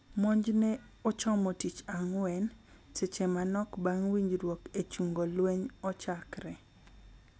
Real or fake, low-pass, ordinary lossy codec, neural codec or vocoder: real; none; none; none